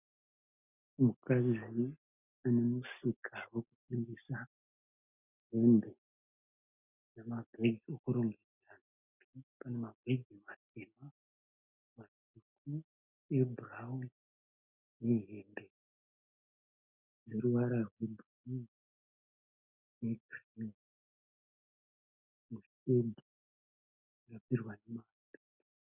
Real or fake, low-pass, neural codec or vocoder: real; 3.6 kHz; none